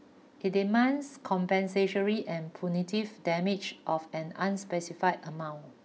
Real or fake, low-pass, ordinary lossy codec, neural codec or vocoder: real; none; none; none